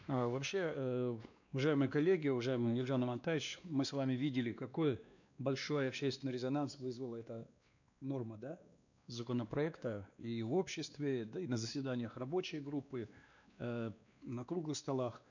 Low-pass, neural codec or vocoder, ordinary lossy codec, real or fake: 7.2 kHz; codec, 16 kHz, 2 kbps, X-Codec, WavLM features, trained on Multilingual LibriSpeech; none; fake